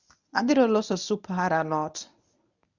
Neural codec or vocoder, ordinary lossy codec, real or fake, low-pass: codec, 24 kHz, 0.9 kbps, WavTokenizer, medium speech release version 1; none; fake; 7.2 kHz